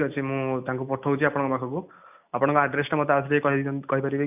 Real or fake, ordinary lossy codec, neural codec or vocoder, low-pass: real; none; none; 3.6 kHz